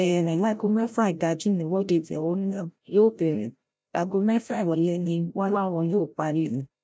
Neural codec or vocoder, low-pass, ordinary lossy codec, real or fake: codec, 16 kHz, 0.5 kbps, FreqCodec, larger model; none; none; fake